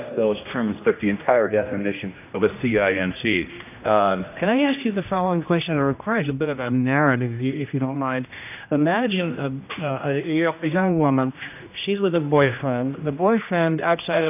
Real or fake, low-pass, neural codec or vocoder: fake; 3.6 kHz; codec, 16 kHz, 1 kbps, X-Codec, HuBERT features, trained on general audio